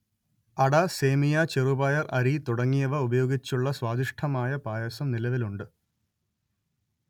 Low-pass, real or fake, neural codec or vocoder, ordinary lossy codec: 19.8 kHz; real; none; none